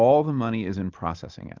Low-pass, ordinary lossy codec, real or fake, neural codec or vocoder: 7.2 kHz; Opus, 32 kbps; real; none